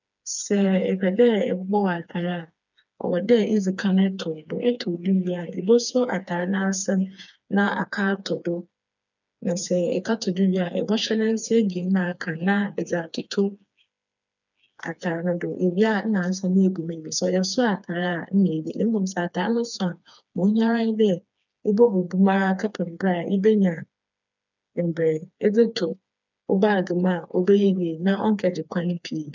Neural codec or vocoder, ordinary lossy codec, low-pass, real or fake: codec, 16 kHz, 4 kbps, FreqCodec, smaller model; none; 7.2 kHz; fake